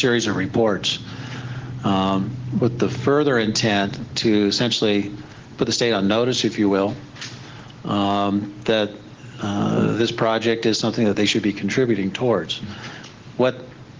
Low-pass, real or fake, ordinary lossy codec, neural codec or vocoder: 7.2 kHz; real; Opus, 16 kbps; none